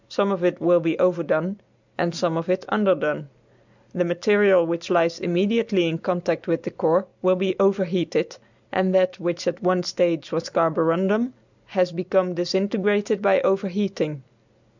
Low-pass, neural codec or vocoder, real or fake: 7.2 kHz; none; real